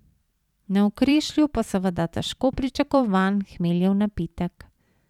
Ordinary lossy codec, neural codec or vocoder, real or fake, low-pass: none; vocoder, 44.1 kHz, 128 mel bands every 512 samples, BigVGAN v2; fake; 19.8 kHz